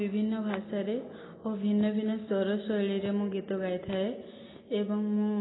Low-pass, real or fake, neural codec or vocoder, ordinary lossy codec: 7.2 kHz; real; none; AAC, 16 kbps